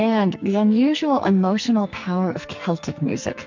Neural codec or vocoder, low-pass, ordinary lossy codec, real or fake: codec, 44.1 kHz, 2.6 kbps, SNAC; 7.2 kHz; MP3, 64 kbps; fake